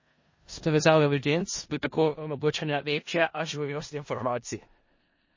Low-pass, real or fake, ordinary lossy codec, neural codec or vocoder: 7.2 kHz; fake; MP3, 32 kbps; codec, 16 kHz in and 24 kHz out, 0.4 kbps, LongCat-Audio-Codec, four codebook decoder